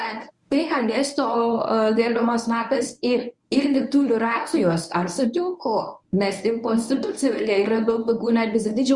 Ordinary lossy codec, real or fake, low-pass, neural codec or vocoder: Opus, 64 kbps; fake; 10.8 kHz; codec, 24 kHz, 0.9 kbps, WavTokenizer, medium speech release version 1